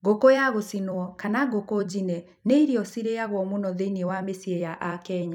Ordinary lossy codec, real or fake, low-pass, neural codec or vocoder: none; fake; 19.8 kHz; vocoder, 44.1 kHz, 128 mel bands every 256 samples, BigVGAN v2